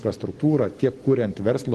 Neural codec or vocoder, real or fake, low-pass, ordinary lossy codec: none; real; 10.8 kHz; Opus, 16 kbps